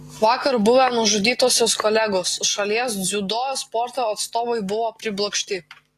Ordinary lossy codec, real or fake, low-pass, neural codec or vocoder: AAC, 48 kbps; real; 14.4 kHz; none